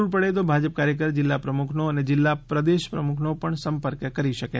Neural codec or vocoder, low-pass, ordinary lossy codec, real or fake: none; none; none; real